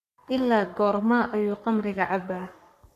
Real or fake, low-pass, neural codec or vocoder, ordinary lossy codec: fake; 14.4 kHz; codec, 44.1 kHz, 3.4 kbps, Pupu-Codec; none